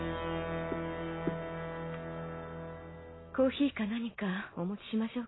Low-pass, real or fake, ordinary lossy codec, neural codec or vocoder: 7.2 kHz; real; AAC, 16 kbps; none